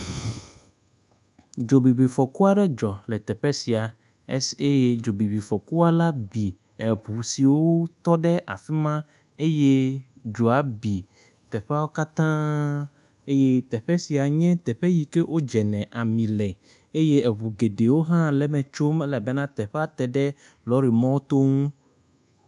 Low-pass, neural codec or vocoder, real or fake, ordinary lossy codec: 10.8 kHz; codec, 24 kHz, 1.2 kbps, DualCodec; fake; AAC, 96 kbps